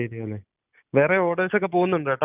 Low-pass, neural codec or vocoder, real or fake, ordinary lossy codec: 3.6 kHz; none; real; none